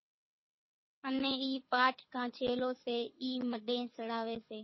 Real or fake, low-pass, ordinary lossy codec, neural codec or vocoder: fake; 7.2 kHz; MP3, 24 kbps; codec, 24 kHz, 6 kbps, HILCodec